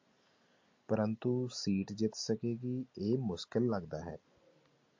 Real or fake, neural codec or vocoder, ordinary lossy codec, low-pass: real; none; MP3, 48 kbps; 7.2 kHz